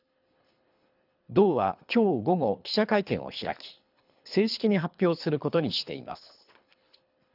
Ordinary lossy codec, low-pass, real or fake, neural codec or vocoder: none; 5.4 kHz; fake; codec, 24 kHz, 3 kbps, HILCodec